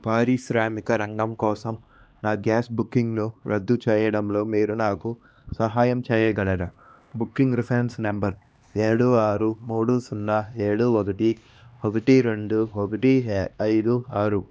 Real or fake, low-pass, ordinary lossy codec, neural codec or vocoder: fake; none; none; codec, 16 kHz, 2 kbps, X-Codec, HuBERT features, trained on LibriSpeech